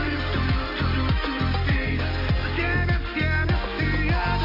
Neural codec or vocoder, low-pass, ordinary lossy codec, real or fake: none; 5.4 kHz; AAC, 32 kbps; real